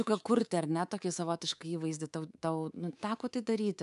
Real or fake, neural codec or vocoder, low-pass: fake; codec, 24 kHz, 3.1 kbps, DualCodec; 10.8 kHz